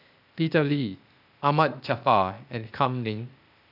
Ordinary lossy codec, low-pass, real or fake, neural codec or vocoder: none; 5.4 kHz; fake; codec, 16 kHz, 0.8 kbps, ZipCodec